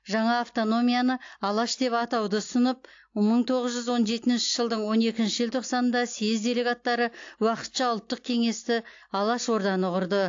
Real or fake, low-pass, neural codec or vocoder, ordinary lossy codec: real; 7.2 kHz; none; AAC, 48 kbps